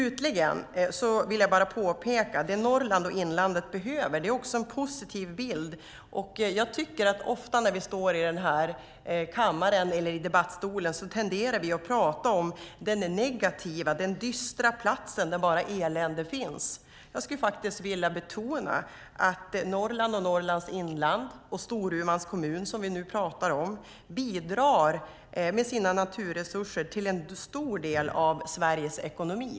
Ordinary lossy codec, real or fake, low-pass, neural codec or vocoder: none; real; none; none